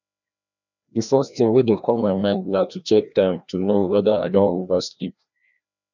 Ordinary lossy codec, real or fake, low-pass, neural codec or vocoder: none; fake; 7.2 kHz; codec, 16 kHz, 1 kbps, FreqCodec, larger model